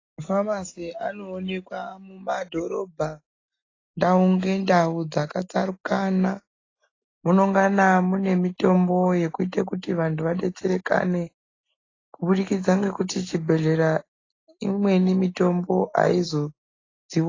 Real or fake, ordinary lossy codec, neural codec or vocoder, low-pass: real; AAC, 32 kbps; none; 7.2 kHz